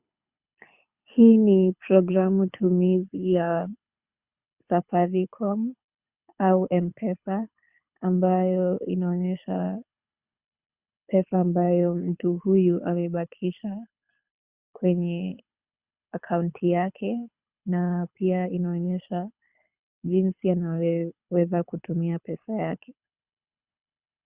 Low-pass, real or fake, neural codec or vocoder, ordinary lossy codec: 3.6 kHz; fake; codec, 24 kHz, 6 kbps, HILCodec; Opus, 64 kbps